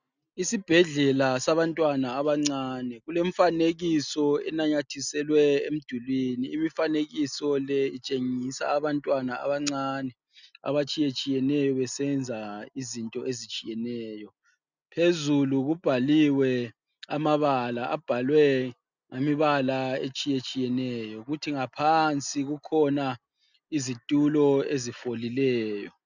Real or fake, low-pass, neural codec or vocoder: real; 7.2 kHz; none